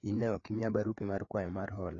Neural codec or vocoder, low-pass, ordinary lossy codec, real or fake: codec, 16 kHz, 4 kbps, FreqCodec, larger model; 7.2 kHz; AAC, 32 kbps; fake